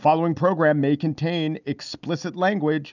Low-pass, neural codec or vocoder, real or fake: 7.2 kHz; none; real